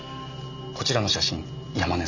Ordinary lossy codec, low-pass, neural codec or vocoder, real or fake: none; 7.2 kHz; none; real